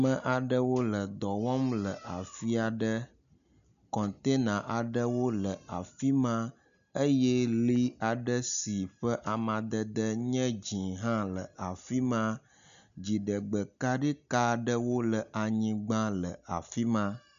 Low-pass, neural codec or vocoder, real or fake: 7.2 kHz; none; real